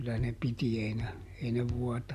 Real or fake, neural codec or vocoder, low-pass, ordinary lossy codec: real; none; 14.4 kHz; none